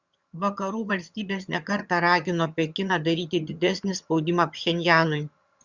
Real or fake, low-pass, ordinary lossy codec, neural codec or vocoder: fake; 7.2 kHz; Opus, 64 kbps; vocoder, 22.05 kHz, 80 mel bands, HiFi-GAN